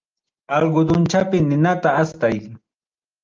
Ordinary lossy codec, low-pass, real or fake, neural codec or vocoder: Opus, 24 kbps; 7.2 kHz; real; none